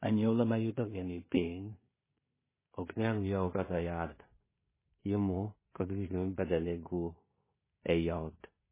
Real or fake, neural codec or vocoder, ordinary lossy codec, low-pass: fake; codec, 16 kHz in and 24 kHz out, 0.4 kbps, LongCat-Audio-Codec, two codebook decoder; MP3, 16 kbps; 3.6 kHz